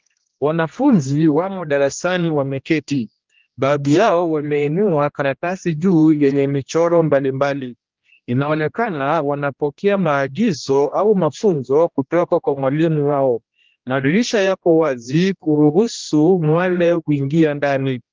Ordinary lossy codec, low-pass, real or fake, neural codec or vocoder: Opus, 32 kbps; 7.2 kHz; fake; codec, 16 kHz, 1 kbps, X-Codec, HuBERT features, trained on general audio